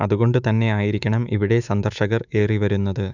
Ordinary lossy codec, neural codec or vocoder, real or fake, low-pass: none; autoencoder, 48 kHz, 128 numbers a frame, DAC-VAE, trained on Japanese speech; fake; 7.2 kHz